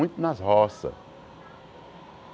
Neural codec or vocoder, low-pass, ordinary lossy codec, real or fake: none; none; none; real